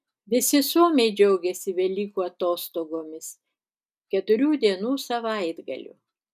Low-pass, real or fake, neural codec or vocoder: 14.4 kHz; real; none